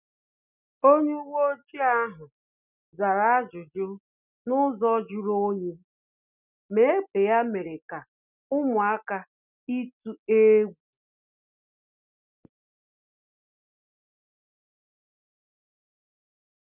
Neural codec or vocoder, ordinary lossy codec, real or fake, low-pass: none; none; real; 3.6 kHz